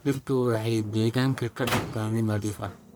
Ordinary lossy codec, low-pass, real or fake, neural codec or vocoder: none; none; fake; codec, 44.1 kHz, 1.7 kbps, Pupu-Codec